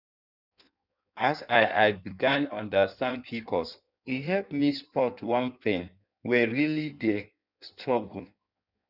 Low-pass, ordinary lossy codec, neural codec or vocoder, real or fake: 5.4 kHz; none; codec, 16 kHz in and 24 kHz out, 1.1 kbps, FireRedTTS-2 codec; fake